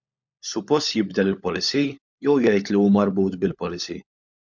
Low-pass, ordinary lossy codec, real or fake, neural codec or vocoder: 7.2 kHz; MP3, 64 kbps; fake; codec, 16 kHz, 16 kbps, FunCodec, trained on LibriTTS, 50 frames a second